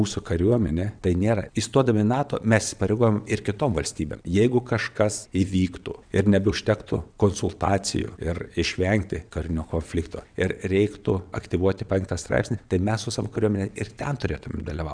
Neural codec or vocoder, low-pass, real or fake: vocoder, 22.05 kHz, 80 mel bands, WaveNeXt; 9.9 kHz; fake